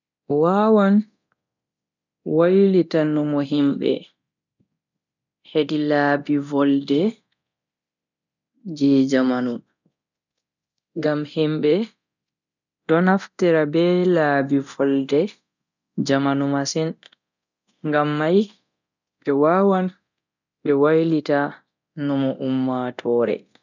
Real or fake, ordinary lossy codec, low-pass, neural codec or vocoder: fake; none; 7.2 kHz; codec, 24 kHz, 0.9 kbps, DualCodec